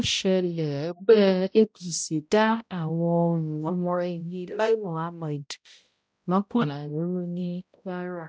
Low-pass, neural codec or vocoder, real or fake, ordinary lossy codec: none; codec, 16 kHz, 0.5 kbps, X-Codec, HuBERT features, trained on balanced general audio; fake; none